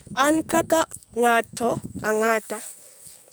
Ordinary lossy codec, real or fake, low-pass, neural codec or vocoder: none; fake; none; codec, 44.1 kHz, 2.6 kbps, SNAC